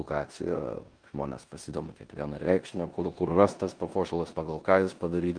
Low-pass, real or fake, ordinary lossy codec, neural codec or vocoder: 9.9 kHz; fake; Opus, 32 kbps; codec, 16 kHz in and 24 kHz out, 0.9 kbps, LongCat-Audio-Codec, four codebook decoder